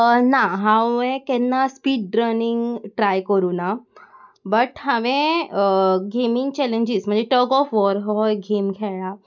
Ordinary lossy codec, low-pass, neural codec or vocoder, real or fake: none; 7.2 kHz; none; real